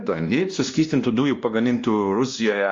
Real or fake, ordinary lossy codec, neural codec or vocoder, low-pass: fake; Opus, 32 kbps; codec, 16 kHz, 1 kbps, X-Codec, WavLM features, trained on Multilingual LibriSpeech; 7.2 kHz